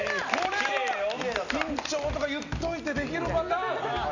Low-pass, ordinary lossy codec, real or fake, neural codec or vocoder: 7.2 kHz; none; real; none